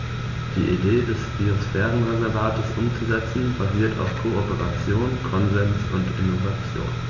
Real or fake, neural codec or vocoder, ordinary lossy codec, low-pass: real; none; none; 7.2 kHz